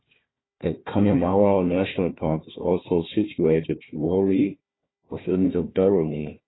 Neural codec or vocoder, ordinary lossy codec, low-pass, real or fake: codec, 16 kHz, 1 kbps, FunCodec, trained on Chinese and English, 50 frames a second; AAC, 16 kbps; 7.2 kHz; fake